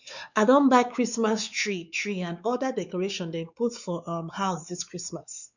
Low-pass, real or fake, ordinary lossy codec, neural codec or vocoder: 7.2 kHz; fake; none; codec, 16 kHz, 4 kbps, X-Codec, WavLM features, trained on Multilingual LibriSpeech